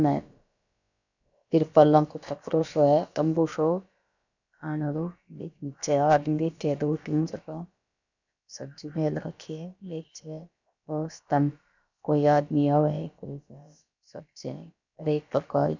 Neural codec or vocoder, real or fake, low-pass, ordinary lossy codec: codec, 16 kHz, about 1 kbps, DyCAST, with the encoder's durations; fake; 7.2 kHz; none